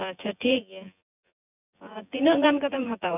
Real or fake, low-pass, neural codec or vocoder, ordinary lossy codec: fake; 3.6 kHz; vocoder, 24 kHz, 100 mel bands, Vocos; none